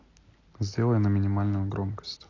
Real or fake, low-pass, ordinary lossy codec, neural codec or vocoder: real; 7.2 kHz; AAC, 32 kbps; none